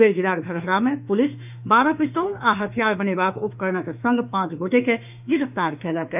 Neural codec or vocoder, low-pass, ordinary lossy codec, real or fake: autoencoder, 48 kHz, 32 numbers a frame, DAC-VAE, trained on Japanese speech; 3.6 kHz; none; fake